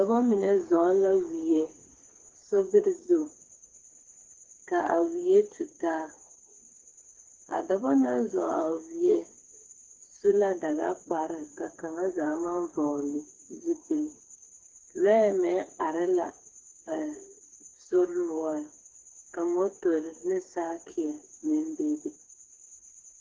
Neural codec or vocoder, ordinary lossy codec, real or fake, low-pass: codec, 16 kHz, 4 kbps, FreqCodec, smaller model; Opus, 16 kbps; fake; 7.2 kHz